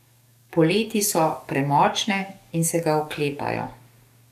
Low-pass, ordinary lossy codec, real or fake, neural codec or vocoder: 14.4 kHz; none; fake; codec, 44.1 kHz, 7.8 kbps, DAC